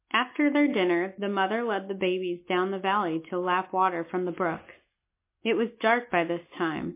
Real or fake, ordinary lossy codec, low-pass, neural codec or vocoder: real; MP3, 24 kbps; 3.6 kHz; none